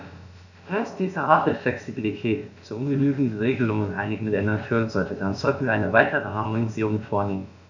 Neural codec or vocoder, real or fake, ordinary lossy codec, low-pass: codec, 16 kHz, about 1 kbps, DyCAST, with the encoder's durations; fake; none; 7.2 kHz